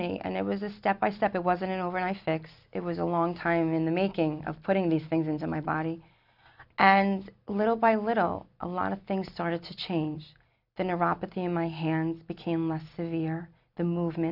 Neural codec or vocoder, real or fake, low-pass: none; real; 5.4 kHz